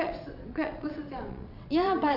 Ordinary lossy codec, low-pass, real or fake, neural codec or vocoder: AAC, 48 kbps; 5.4 kHz; fake; vocoder, 22.05 kHz, 80 mel bands, WaveNeXt